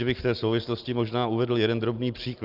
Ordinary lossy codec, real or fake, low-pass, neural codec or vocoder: Opus, 24 kbps; real; 5.4 kHz; none